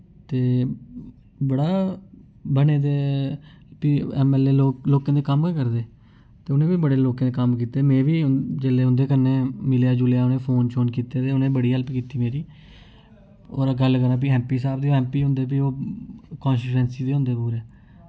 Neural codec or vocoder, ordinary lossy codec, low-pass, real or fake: none; none; none; real